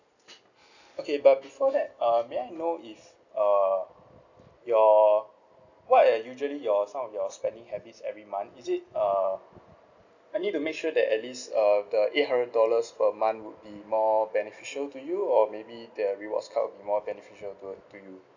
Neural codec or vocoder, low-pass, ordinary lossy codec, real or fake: none; 7.2 kHz; none; real